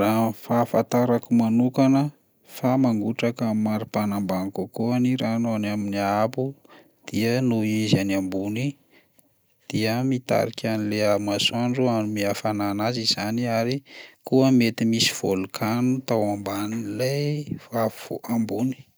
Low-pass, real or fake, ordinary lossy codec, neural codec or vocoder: none; real; none; none